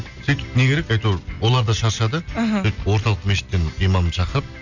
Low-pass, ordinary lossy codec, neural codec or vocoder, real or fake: 7.2 kHz; none; none; real